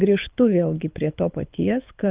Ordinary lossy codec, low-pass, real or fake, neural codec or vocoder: Opus, 24 kbps; 3.6 kHz; fake; codec, 24 kHz, 6 kbps, HILCodec